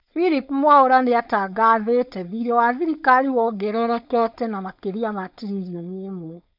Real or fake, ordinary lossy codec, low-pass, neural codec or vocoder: fake; none; 5.4 kHz; codec, 16 kHz, 4.8 kbps, FACodec